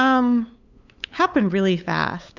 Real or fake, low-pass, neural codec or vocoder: fake; 7.2 kHz; codec, 16 kHz, 8 kbps, FunCodec, trained on LibriTTS, 25 frames a second